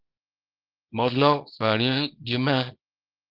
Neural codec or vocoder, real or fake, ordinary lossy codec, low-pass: codec, 24 kHz, 0.9 kbps, WavTokenizer, small release; fake; Opus, 32 kbps; 5.4 kHz